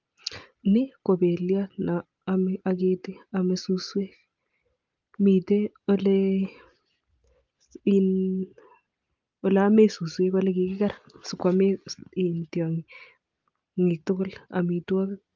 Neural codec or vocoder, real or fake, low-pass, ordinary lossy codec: none; real; 7.2 kHz; Opus, 32 kbps